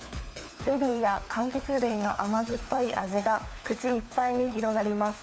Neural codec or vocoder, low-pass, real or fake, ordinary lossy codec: codec, 16 kHz, 4 kbps, FunCodec, trained on LibriTTS, 50 frames a second; none; fake; none